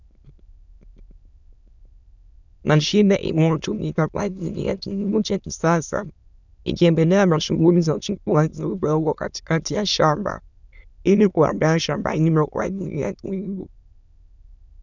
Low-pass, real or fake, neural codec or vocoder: 7.2 kHz; fake; autoencoder, 22.05 kHz, a latent of 192 numbers a frame, VITS, trained on many speakers